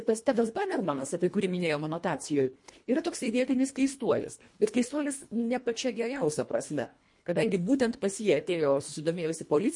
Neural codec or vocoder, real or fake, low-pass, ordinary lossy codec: codec, 24 kHz, 1.5 kbps, HILCodec; fake; 10.8 kHz; MP3, 48 kbps